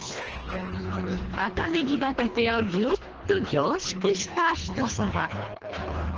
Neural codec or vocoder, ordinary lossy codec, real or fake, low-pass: codec, 24 kHz, 1.5 kbps, HILCodec; Opus, 16 kbps; fake; 7.2 kHz